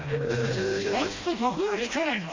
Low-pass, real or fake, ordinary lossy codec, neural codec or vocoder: 7.2 kHz; fake; AAC, 32 kbps; codec, 16 kHz, 1 kbps, FreqCodec, smaller model